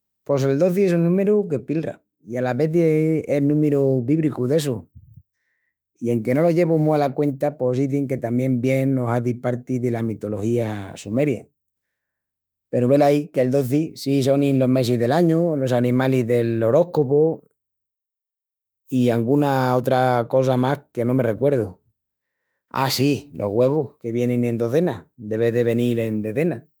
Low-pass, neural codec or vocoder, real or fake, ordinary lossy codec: none; autoencoder, 48 kHz, 32 numbers a frame, DAC-VAE, trained on Japanese speech; fake; none